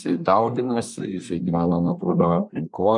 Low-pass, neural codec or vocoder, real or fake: 10.8 kHz; codec, 24 kHz, 1 kbps, SNAC; fake